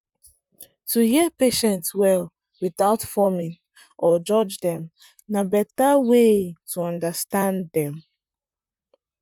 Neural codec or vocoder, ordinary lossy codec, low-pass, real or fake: vocoder, 44.1 kHz, 128 mel bands, Pupu-Vocoder; none; 19.8 kHz; fake